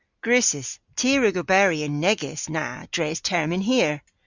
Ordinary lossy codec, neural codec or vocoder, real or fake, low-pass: Opus, 64 kbps; none; real; 7.2 kHz